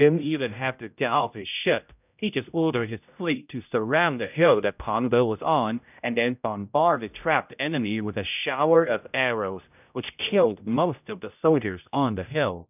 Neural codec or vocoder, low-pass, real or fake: codec, 16 kHz, 0.5 kbps, X-Codec, HuBERT features, trained on general audio; 3.6 kHz; fake